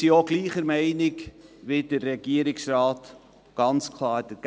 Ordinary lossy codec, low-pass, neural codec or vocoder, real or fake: none; none; none; real